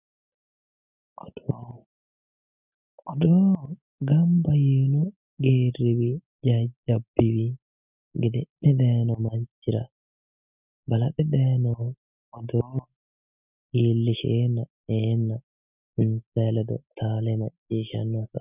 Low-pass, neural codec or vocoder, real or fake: 3.6 kHz; none; real